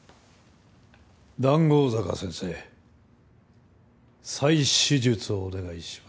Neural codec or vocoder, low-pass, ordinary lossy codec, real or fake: none; none; none; real